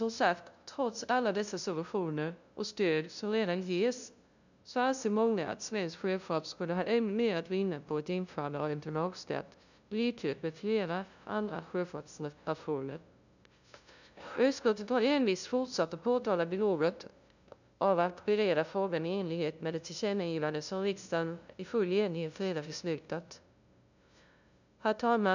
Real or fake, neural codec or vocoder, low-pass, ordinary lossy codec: fake; codec, 16 kHz, 0.5 kbps, FunCodec, trained on LibriTTS, 25 frames a second; 7.2 kHz; none